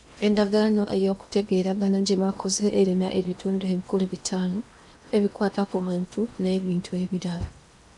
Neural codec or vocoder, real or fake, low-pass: codec, 16 kHz in and 24 kHz out, 0.8 kbps, FocalCodec, streaming, 65536 codes; fake; 10.8 kHz